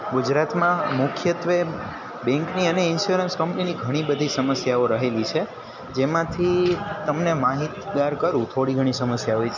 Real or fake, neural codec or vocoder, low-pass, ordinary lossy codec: real; none; 7.2 kHz; none